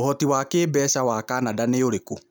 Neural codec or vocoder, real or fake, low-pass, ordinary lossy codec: none; real; none; none